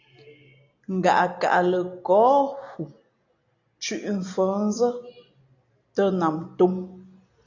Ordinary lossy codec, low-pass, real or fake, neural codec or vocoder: AAC, 48 kbps; 7.2 kHz; real; none